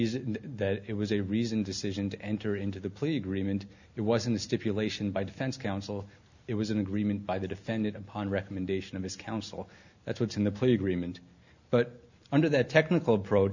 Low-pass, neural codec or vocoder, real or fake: 7.2 kHz; none; real